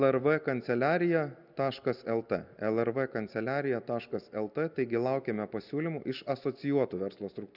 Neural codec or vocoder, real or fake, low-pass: none; real; 5.4 kHz